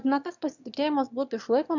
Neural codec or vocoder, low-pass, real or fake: autoencoder, 22.05 kHz, a latent of 192 numbers a frame, VITS, trained on one speaker; 7.2 kHz; fake